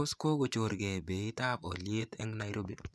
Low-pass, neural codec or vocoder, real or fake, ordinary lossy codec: none; vocoder, 24 kHz, 100 mel bands, Vocos; fake; none